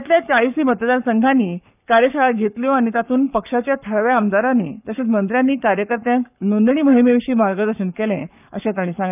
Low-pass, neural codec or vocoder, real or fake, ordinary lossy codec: 3.6 kHz; codec, 44.1 kHz, 7.8 kbps, Pupu-Codec; fake; none